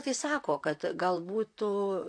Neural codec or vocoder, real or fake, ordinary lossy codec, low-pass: vocoder, 44.1 kHz, 128 mel bands, Pupu-Vocoder; fake; AAC, 48 kbps; 9.9 kHz